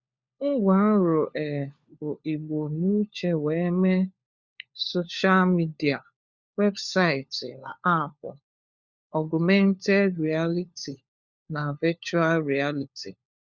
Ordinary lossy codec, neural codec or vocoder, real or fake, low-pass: Opus, 64 kbps; codec, 16 kHz, 4 kbps, FunCodec, trained on LibriTTS, 50 frames a second; fake; 7.2 kHz